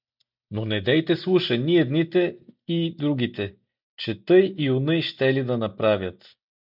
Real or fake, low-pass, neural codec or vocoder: real; 5.4 kHz; none